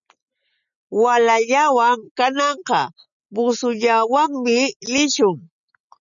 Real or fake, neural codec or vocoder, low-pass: real; none; 7.2 kHz